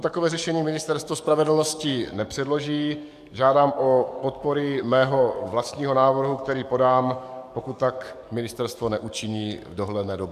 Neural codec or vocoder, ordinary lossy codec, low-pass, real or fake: codec, 44.1 kHz, 7.8 kbps, DAC; AAC, 96 kbps; 14.4 kHz; fake